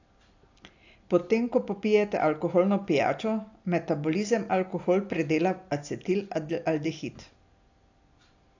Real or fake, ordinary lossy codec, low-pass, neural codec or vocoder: real; AAC, 48 kbps; 7.2 kHz; none